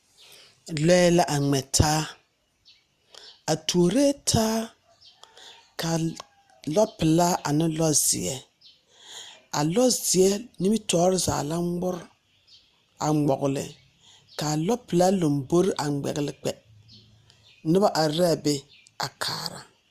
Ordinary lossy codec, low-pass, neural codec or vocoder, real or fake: Opus, 64 kbps; 14.4 kHz; none; real